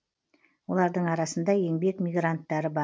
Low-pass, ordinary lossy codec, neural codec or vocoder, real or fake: none; none; none; real